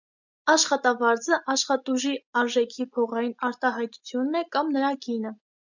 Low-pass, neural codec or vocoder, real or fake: 7.2 kHz; none; real